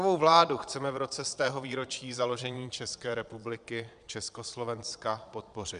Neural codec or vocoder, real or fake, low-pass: vocoder, 22.05 kHz, 80 mel bands, Vocos; fake; 9.9 kHz